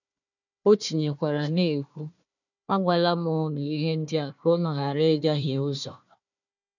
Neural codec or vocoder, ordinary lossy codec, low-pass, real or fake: codec, 16 kHz, 1 kbps, FunCodec, trained on Chinese and English, 50 frames a second; none; 7.2 kHz; fake